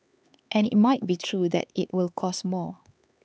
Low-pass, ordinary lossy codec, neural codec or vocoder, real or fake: none; none; codec, 16 kHz, 4 kbps, X-Codec, HuBERT features, trained on LibriSpeech; fake